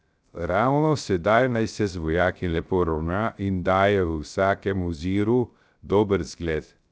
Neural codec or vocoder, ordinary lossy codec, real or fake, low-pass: codec, 16 kHz, 0.3 kbps, FocalCodec; none; fake; none